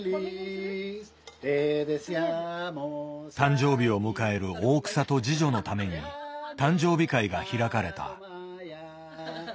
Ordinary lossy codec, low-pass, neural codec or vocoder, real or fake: none; none; none; real